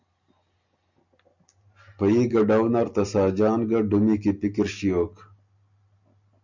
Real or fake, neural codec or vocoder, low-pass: real; none; 7.2 kHz